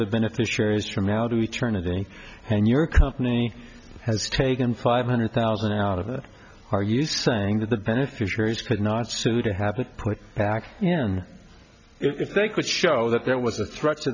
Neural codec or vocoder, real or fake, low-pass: none; real; 7.2 kHz